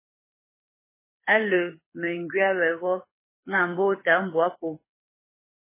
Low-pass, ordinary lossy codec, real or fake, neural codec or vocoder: 3.6 kHz; MP3, 16 kbps; fake; codec, 24 kHz, 6 kbps, HILCodec